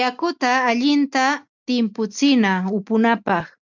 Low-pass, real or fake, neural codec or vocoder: 7.2 kHz; real; none